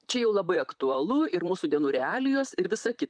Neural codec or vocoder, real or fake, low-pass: vocoder, 44.1 kHz, 128 mel bands, Pupu-Vocoder; fake; 9.9 kHz